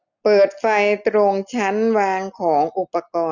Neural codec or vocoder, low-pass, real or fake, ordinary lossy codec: none; 7.2 kHz; real; none